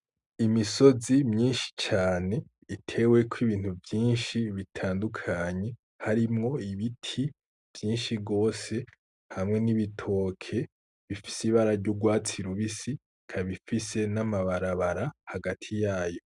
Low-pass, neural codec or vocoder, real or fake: 10.8 kHz; none; real